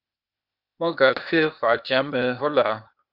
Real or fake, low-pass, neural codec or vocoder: fake; 5.4 kHz; codec, 16 kHz, 0.8 kbps, ZipCodec